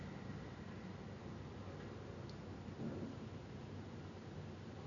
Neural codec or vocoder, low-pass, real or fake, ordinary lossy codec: none; 7.2 kHz; real; none